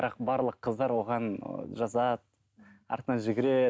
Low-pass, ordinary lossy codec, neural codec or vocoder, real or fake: none; none; none; real